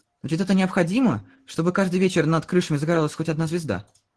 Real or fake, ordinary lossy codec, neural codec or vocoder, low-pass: real; Opus, 16 kbps; none; 10.8 kHz